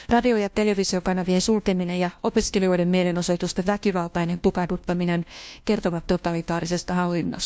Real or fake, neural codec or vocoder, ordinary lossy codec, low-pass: fake; codec, 16 kHz, 1 kbps, FunCodec, trained on LibriTTS, 50 frames a second; none; none